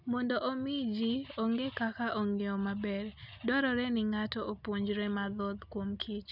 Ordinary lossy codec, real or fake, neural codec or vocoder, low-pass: none; real; none; 5.4 kHz